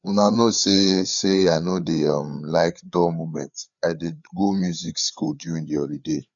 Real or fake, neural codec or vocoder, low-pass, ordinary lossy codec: fake; codec, 16 kHz, 4 kbps, FreqCodec, larger model; 7.2 kHz; none